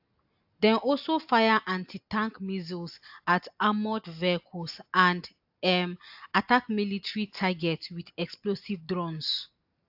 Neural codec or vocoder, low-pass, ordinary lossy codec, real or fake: none; 5.4 kHz; AAC, 48 kbps; real